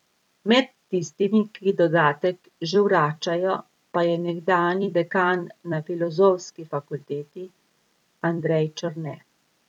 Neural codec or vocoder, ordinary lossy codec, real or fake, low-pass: vocoder, 44.1 kHz, 128 mel bands every 256 samples, BigVGAN v2; none; fake; 19.8 kHz